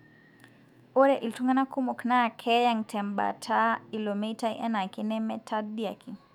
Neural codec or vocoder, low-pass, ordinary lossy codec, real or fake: autoencoder, 48 kHz, 128 numbers a frame, DAC-VAE, trained on Japanese speech; 19.8 kHz; none; fake